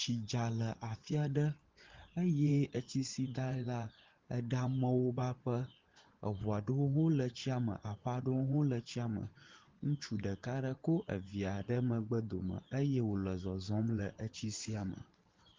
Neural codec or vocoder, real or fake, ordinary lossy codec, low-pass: vocoder, 24 kHz, 100 mel bands, Vocos; fake; Opus, 16 kbps; 7.2 kHz